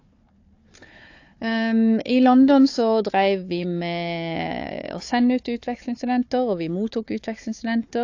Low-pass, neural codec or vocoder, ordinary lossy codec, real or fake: 7.2 kHz; codec, 16 kHz, 4 kbps, FunCodec, trained on Chinese and English, 50 frames a second; AAC, 48 kbps; fake